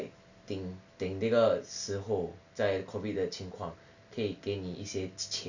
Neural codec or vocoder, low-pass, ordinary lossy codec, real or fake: none; 7.2 kHz; none; real